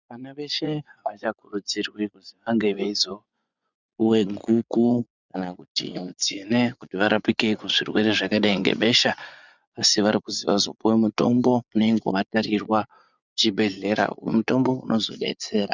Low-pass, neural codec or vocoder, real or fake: 7.2 kHz; vocoder, 24 kHz, 100 mel bands, Vocos; fake